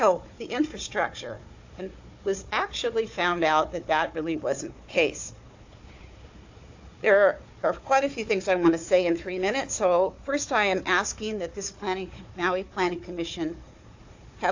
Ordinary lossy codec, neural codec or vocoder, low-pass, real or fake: AAC, 48 kbps; codec, 16 kHz, 4 kbps, FunCodec, trained on Chinese and English, 50 frames a second; 7.2 kHz; fake